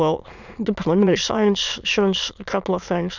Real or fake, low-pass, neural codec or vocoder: fake; 7.2 kHz; autoencoder, 22.05 kHz, a latent of 192 numbers a frame, VITS, trained on many speakers